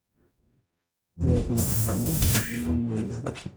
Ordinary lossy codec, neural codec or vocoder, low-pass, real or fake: none; codec, 44.1 kHz, 0.9 kbps, DAC; none; fake